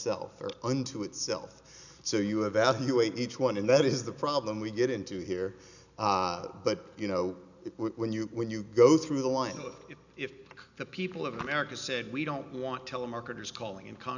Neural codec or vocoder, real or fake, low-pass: none; real; 7.2 kHz